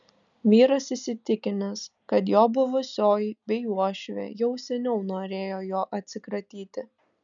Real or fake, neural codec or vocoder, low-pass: real; none; 7.2 kHz